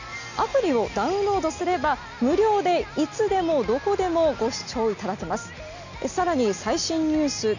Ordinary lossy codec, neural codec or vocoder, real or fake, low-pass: none; none; real; 7.2 kHz